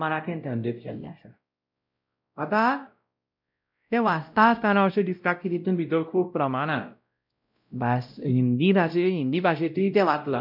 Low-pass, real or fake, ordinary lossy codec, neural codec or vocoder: 5.4 kHz; fake; none; codec, 16 kHz, 0.5 kbps, X-Codec, WavLM features, trained on Multilingual LibriSpeech